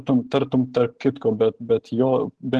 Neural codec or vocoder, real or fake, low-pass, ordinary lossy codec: vocoder, 44.1 kHz, 128 mel bands every 512 samples, BigVGAN v2; fake; 10.8 kHz; Opus, 16 kbps